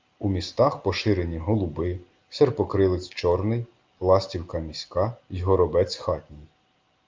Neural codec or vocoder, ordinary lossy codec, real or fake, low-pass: none; Opus, 32 kbps; real; 7.2 kHz